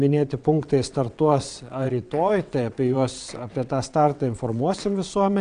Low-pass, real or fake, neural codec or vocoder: 9.9 kHz; fake; vocoder, 22.05 kHz, 80 mel bands, WaveNeXt